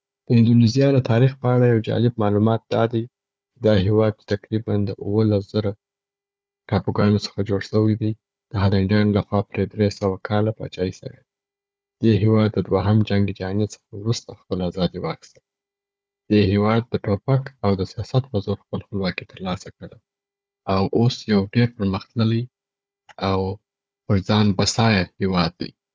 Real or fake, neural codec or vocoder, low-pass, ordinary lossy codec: fake; codec, 16 kHz, 4 kbps, FunCodec, trained on Chinese and English, 50 frames a second; none; none